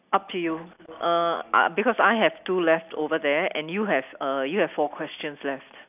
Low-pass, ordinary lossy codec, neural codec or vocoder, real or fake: 3.6 kHz; none; none; real